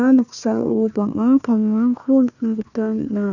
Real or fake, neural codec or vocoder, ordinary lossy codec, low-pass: fake; codec, 16 kHz, 1 kbps, FunCodec, trained on Chinese and English, 50 frames a second; none; 7.2 kHz